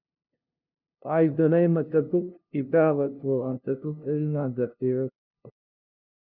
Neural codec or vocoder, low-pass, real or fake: codec, 16 kHz, 0.5 kbps, FunCodec, trained on LibriTTS, 25 frames a second; 5.4 kHz; fake